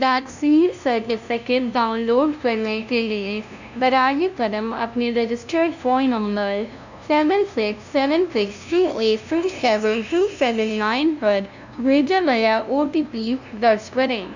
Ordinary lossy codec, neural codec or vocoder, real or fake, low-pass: none; codec, 16 kHz, 0.5 kbps, FunCodec, trained on LibriTTS, 25 frames a second; fake; 7.2 kHz